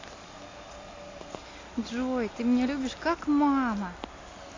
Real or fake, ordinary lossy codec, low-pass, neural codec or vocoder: real; AAC, 32 kbps; 7.2 kHz; none